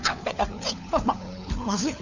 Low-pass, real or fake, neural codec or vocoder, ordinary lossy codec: 7.2 kHz; fake; codec, 16 kHz, 4 kbps, FunCodec, trained on LibriTTS, 50 frames a second; none